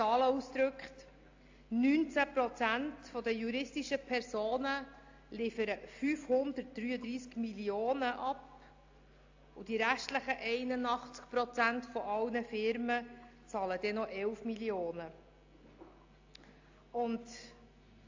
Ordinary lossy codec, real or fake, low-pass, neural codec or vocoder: AAC, 48 kbps; real; 7.2 kHz; none